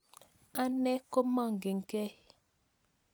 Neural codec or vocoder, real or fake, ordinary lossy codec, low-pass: none; real; none; none